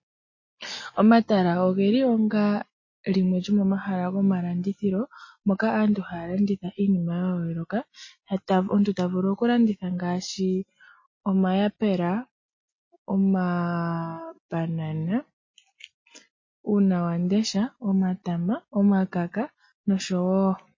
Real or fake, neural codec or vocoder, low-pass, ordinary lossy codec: real; none; 7.2 kHz; MP3, 32 kbps